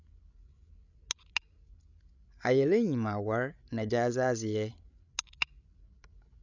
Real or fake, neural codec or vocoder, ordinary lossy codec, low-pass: fake; codec, 16 kHz, 16 kbps, FreqCodec, larger model; none; 7.2 kHz